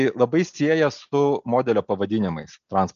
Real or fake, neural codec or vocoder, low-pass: real; none; 7.2 kHz